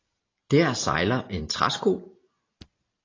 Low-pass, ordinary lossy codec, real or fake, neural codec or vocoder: 7.2 kHz; AAC, 32 kbps; real; none